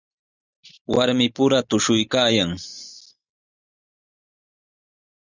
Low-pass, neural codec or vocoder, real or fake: 7.2 kHz; none; real